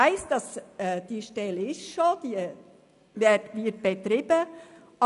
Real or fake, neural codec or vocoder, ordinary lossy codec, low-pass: real; none; none; 10.8 kHz